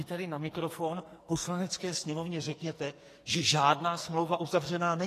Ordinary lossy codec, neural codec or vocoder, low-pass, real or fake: AAC, 48 kbps; codec, 44.1 kHz, 2.6 kbps, SNAC; 14.4 kHz; fake